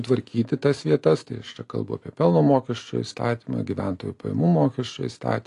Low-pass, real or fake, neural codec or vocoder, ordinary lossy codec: 10.8 kHz; real; none; AAC, 48 kbps